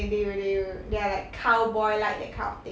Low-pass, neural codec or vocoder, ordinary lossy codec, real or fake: none; none; none; real